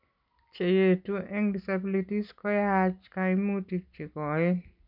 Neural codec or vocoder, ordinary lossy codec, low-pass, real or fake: none; none; 5.4 kHz; real